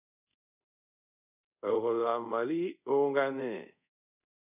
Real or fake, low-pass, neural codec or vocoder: fake; 3.6 kHz; codec, 24 kHz, 0.5 kbps, DualCodec